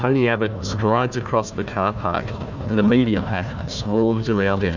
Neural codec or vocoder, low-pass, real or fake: codec, 16 kHz, 1 kbps, FunCodec, trained on Chinese and English, 50 frames a second; 7.2 kHz; fake